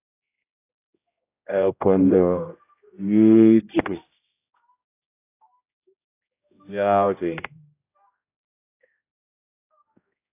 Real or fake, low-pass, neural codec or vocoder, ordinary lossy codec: fake; 3.6 kHz; codec, 16 kHz, 0.5 kbps, X-Codec, HuBERT features, trained on general audio; AAC, 24 kbps